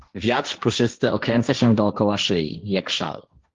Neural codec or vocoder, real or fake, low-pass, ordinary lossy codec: codec, 16 kHz, 1.1 kbps, Voila-Tokenizer; fake; 7.2 kHz; Opus, 32 kbps